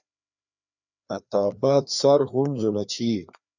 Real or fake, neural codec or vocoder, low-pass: fake; codec, 16 kHz, 2 kbps, FreqCodec, larger model; 7.2 kHz